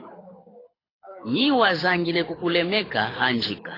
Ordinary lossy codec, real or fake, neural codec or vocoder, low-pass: AAC, 24 kbps; fake; codec, 24 kHz, 6 kbps, HILCodec; 5.4 kHz